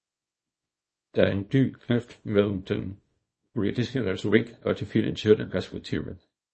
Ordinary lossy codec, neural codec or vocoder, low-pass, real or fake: MP3, 32 kbps; codec, 24 kHz, 0.9 kbps, WavTokenizer, small release; 10.8 kHz; fake